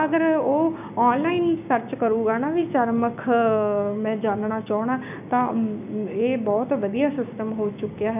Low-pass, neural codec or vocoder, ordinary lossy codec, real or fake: 3.6 kHz; codec, 16 kHz, 6 kbps, DAC; none; fake